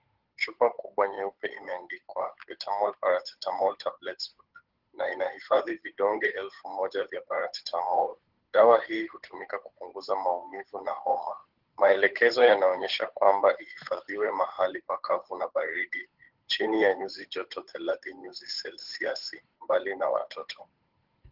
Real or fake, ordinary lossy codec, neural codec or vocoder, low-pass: fake; Opus, 16 kbps; codec, 16 kHz, 8 kbps, FunCodec, trained on Chinese and English, 25 frames a second; 5.4 kHz